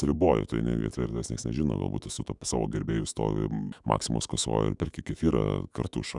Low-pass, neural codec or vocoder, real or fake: 10.8 kHz; autoencoder, 48 kHz, 128 numbers a frame, DAC-VAE, trained on Japanese speech; fake